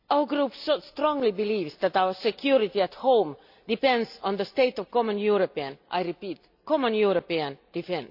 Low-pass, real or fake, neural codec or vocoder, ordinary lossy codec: 5.4 kHz; real; none; AAC, 48 kbps